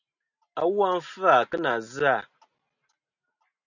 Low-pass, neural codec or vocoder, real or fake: 7.2 kHz; none; real